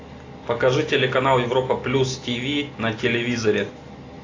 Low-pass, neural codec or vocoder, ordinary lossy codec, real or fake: 7.2 kHz; none; AAC, 32 kbps; real